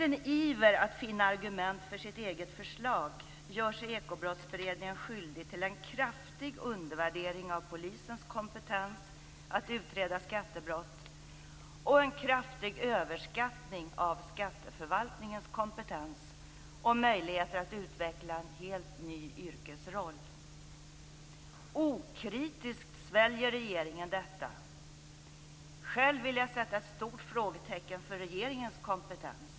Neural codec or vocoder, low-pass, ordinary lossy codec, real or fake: none; none; none; real